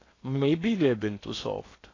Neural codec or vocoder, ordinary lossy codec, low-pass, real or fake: codec, 16 kHz in and 24 kHz out, 0.8 kbps, FocalCodec, streaming, 65536 codes; AAC, 32 kbps; 7.2 kHz; fake